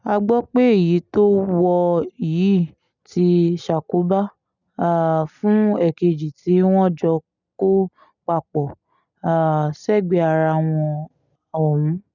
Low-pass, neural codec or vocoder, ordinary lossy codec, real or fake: 7.2 kHz; none; none; real